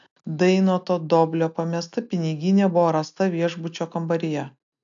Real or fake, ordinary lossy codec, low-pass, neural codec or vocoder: real; AAC, 64 kbps; 7.2 kHz; none